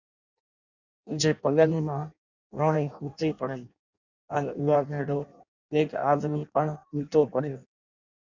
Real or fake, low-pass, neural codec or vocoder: fake; 7.2 kHz; codec, 16 kHz in and 24 kHz out, 0.6 kbps, FireRedTTS-2 codec